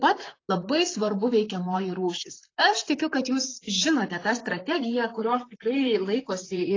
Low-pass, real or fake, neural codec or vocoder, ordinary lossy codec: 7.2 kHz; fake; vocoder, 44.1 kHz, 128 mel bands, Pupu-Vocoder; AAC, 32 kbps